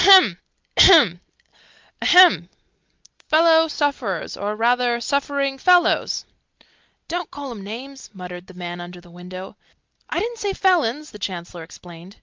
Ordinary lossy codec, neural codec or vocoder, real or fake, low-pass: Opus, 32 kbps; none; real; 7.2 kHz